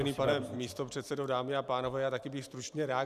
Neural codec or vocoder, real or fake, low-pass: vocoder, 44.1 kHz, 128 mel bands every 512 samples, BigVGAN v2; fake; 14.4 kHz